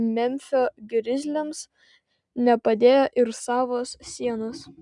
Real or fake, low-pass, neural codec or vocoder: real; 10.8 kHz; none